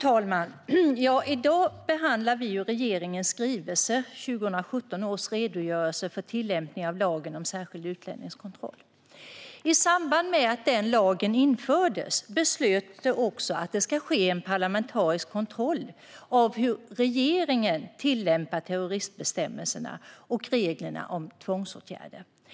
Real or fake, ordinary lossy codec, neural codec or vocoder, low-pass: real; none; none; none